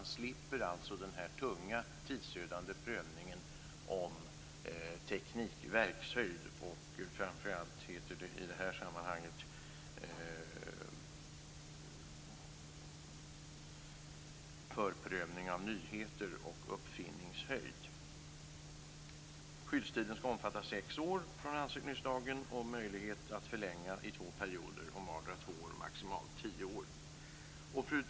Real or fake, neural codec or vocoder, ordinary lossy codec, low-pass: real; none; none; none